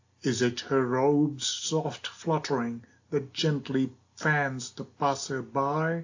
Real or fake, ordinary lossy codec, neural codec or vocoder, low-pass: real; AAC, 48 kbps; none; 7.2 kHz